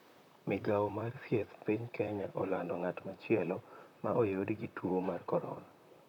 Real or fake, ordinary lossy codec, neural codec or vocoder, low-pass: fake; none; vocoder, 44.1 kHz, 128 mel bands, Pupu-Vocoder; 19.8 kHz